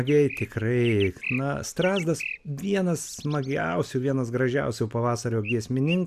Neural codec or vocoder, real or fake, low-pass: none; real; 14.4 kHz